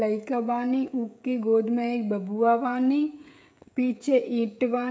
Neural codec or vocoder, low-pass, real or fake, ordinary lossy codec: codec, 16 kHz, 16 kbps, FreqCodec, smaller model; none; fake; none